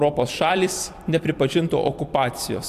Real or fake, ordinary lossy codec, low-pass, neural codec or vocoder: fake; AAC, 96 kbps; 14.4 kHz; vocoder, 44.1 kHz, 128 mel bands every 256 samples, BigVGAN v2